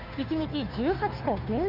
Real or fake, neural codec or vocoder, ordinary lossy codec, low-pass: fake; codec, 16 kHz, 2 kbps, FunCodec, trained on Chinese and English, 25 frames a second; none; 5.4 kHz